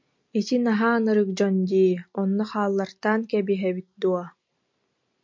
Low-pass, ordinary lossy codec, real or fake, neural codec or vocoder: 7.2 kHz; MP3, 48 kbps; real; none